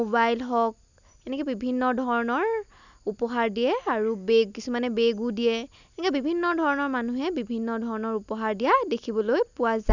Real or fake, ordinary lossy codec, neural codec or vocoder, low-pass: real; none; none; 7.2 kHz